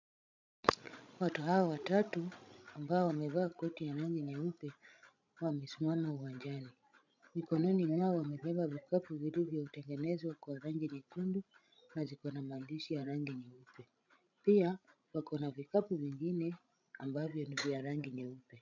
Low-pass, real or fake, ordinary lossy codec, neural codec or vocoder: 7.2 kHz; fake; MP3, 64 kbps; codec, 16 kHz, 16 kbps, FreqCodec, larger model